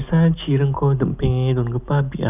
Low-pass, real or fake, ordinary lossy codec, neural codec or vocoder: 3.6 kHz; real; none; none